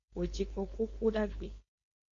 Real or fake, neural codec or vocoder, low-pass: fake; codec, 16 kHz, 4.8 kbps, FACodec; 7.2 kHz